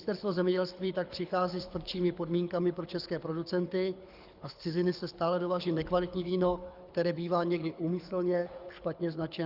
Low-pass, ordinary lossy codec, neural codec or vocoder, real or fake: 5.4 kHz; Opus, 64 kbps; codec, 24 kHz, 6 kbps, HILCodec; fake